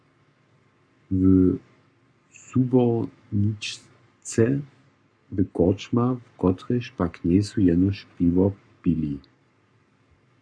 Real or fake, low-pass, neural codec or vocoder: fake; 9.9 kHz; codec, 44.1 kHz, 7.8 kbps, Pupu-Codec